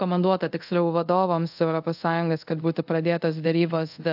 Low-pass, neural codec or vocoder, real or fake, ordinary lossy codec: 5.4 kHz; codec, 24 kHz, 0.5 kbps, DualCodec; fake; AAC, 48 kbps